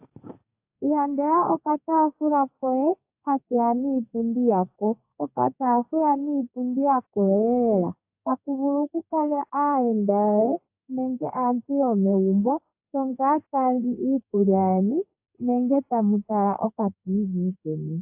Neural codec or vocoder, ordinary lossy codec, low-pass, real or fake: codec, 32 kHz, 1.9 kbps, SNAC; AAC, 32 kbps; 3.6 kHz; fake